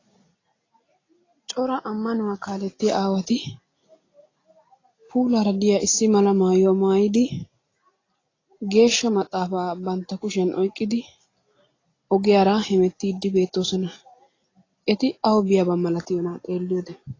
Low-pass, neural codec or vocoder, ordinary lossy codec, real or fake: 7.2 kHz; none; AAC, 32 kbps; real